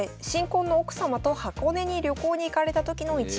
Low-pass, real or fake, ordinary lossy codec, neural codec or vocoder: none; real; none; none